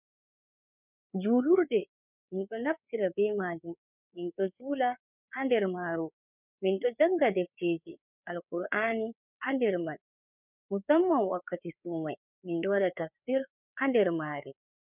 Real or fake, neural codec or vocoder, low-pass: fake; codec, 16 kHz, 4 kbps, FreqCodec, larger model; 3.6 kHz